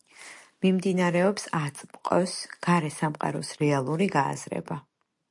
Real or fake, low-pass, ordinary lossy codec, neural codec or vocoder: real; 10.8 kHz; MP3, 64 kbps; none